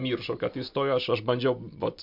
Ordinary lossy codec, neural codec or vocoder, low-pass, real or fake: MP3, 48 kbps; none; 5.4 kHz; real